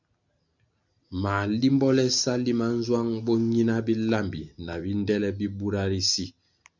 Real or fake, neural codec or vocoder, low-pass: real; none; 7.2 kHz